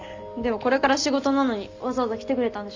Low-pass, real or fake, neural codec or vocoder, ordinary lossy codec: 7.2 kHz; real; none; MP3, 64 kbps